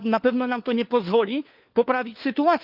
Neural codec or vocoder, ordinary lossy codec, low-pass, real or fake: codec, 16 kHz, 4 kbps, FunCodec, trained on LibriTTS, 50 frames a second; Opus, 24 kbps; 5.4 kHz; fake